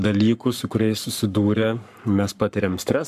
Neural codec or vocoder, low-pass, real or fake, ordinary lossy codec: codec, 44.1 kHz, 7.8 kbps, Pupu-Codec; 14.4 kHz; fake; MP3, 96 kbps